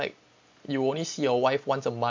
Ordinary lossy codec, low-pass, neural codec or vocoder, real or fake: MP3, 48 kbps; 7.2 kHz; none; real